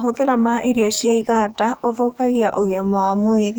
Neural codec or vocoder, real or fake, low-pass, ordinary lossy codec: codec, 44.1 kHz, 2.6 kbps, SNAC; fake; none; none